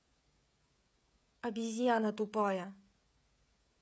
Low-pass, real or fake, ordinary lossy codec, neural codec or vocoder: none; fake; none; codec, 16 kHz, 16 kbps, FreqCodec, smaller model